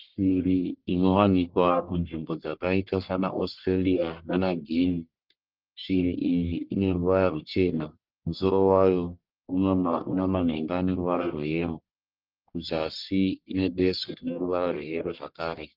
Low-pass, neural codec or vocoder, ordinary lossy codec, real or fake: 5.4 kHz; codec, 44.1 kHz, 1.7 kbps, Pupu-Codec; Opus, 24 kbps; fake